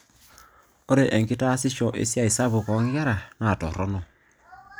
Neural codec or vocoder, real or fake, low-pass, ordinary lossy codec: none; real; none; none